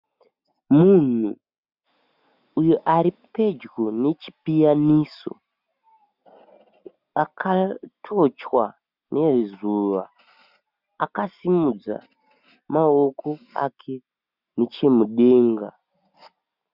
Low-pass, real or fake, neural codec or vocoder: 5.4 kHz; real; none